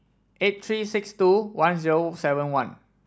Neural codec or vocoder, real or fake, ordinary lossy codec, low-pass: none; real; none; none